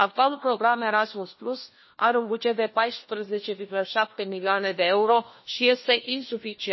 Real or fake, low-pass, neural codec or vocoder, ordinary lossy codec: fake; 7.2 kHz; codec, 16 kHz, 1 kbps, FunCodec, trained on LibriTTS, 50 frames a second; MP3, 24 kbps